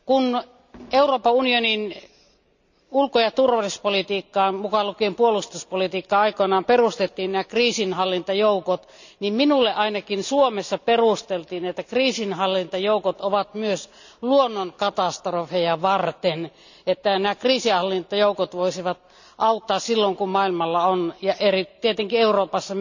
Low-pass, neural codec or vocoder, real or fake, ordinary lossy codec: 7.2 kHz; none; real; none